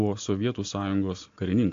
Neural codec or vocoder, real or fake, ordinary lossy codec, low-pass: none; real; AAC, 64 kbps; 7.2 kHz